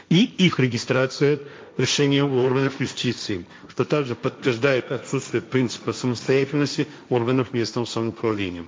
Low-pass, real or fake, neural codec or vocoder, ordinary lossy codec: none; fake; codec, 16 kHz, 1.1 kbps, Voila-Tokenizer; none